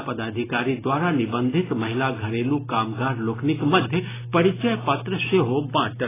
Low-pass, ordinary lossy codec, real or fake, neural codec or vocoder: 3.6 kHz; AAC, 16 kbps; real; none